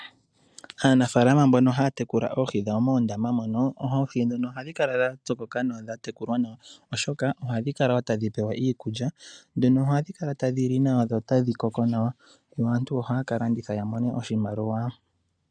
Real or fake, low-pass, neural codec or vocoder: real; 9.9 kHz; none